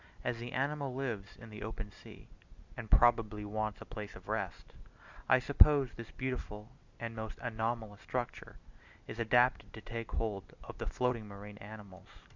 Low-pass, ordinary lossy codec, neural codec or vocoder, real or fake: 7.2 kHz; Opus, 64 kbps; none; real